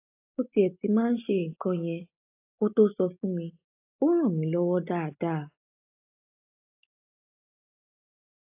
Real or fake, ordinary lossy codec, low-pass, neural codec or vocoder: real; AAC, 24 kbps; 3.6 kHz; none